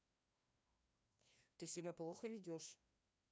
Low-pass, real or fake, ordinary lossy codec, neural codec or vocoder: none; fake; none; codec, 16 kHz, 1 kbps, FreqCodec, larger model